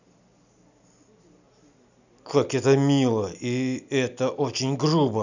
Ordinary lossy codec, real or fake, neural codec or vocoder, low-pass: none; real; none; 7.2 kHz